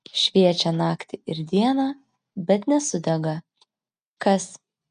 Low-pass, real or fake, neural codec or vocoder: 9.9 kHz; real; none